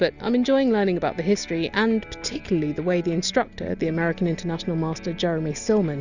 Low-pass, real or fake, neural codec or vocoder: 7.2 kHz; real; none